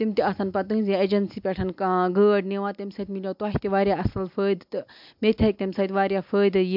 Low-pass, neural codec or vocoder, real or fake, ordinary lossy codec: 5.4 kHz; none; real; MP3, 48 kbps